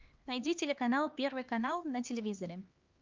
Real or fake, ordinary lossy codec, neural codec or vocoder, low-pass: fake; Opus, 32 kbps; codec, 16 kHz, 2 kbps, X-Codec, HuBERT features, trained on LibriSpeech; 7.2 kHz